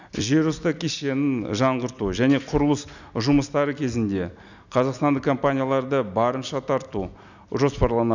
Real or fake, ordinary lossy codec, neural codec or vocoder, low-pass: real; none; none; 7.2 kHz